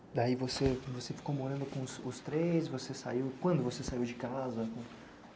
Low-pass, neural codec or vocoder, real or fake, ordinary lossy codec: none; none; real; none